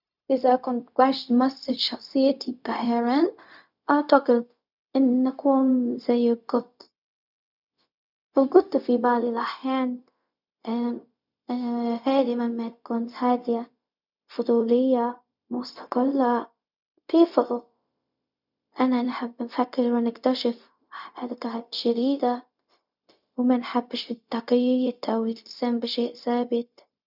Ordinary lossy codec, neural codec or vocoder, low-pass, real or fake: none; codec, 16 kHz, 0.4 kbps, LongCat-Audio-Codec; 5.4 kHz; fake